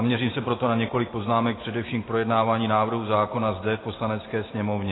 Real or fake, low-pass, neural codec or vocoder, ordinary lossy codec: real; 7.2 kHz; none; AAC, 16 kbps